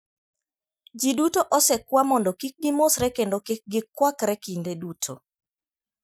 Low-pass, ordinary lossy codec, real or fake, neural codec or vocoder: none; none; real; none